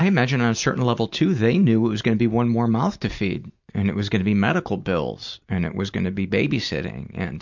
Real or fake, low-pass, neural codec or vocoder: real; 7.2 kHz; none